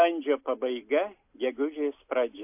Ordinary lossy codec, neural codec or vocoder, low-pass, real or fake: AAC, 32 kbps; none; 3.6 kHz; real